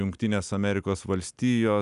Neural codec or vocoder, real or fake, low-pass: none; real; 10.8 kHz